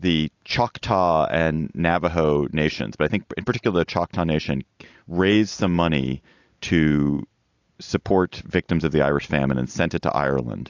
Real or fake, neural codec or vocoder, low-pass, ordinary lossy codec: real; none; 7.2 kHz; AAC, 48 kbps